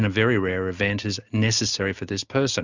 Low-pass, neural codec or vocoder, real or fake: 7.2 kHz; none; real